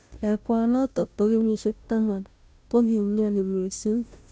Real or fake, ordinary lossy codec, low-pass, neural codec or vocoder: fake; none; none; codec, 16 kHz, 0.5 kbps, FunCodec, trained on Chinese and English, 25 frames a second